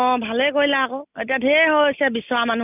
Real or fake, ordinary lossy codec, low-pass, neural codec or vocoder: real; none; 3.6 kHz; none